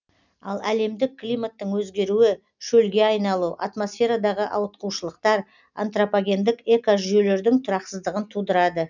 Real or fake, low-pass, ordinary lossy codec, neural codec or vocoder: real; 7.2 kHz; none; none